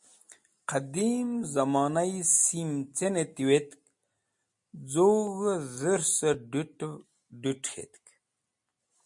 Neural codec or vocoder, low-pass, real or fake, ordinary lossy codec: none; 10.8 kHz; real; MP3, 48 kbps